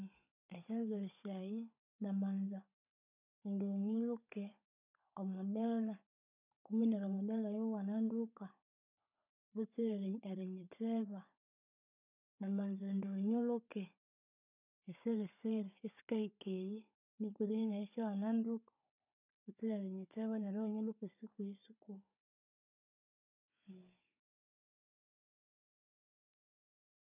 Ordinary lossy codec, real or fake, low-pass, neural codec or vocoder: none; fake; 3.6 kHz; codec, 16 kHz, 16 kbps, FunCodec, trained on Chinese and English, 50 frames a second